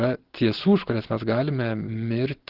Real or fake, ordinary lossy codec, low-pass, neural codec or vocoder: real; Opus, 16 kbps; 5.4 kHz; none